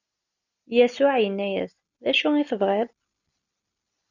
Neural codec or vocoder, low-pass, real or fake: none; 7.2 kHz; real